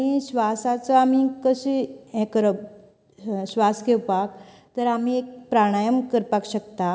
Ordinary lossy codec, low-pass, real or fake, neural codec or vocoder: none; none; real; none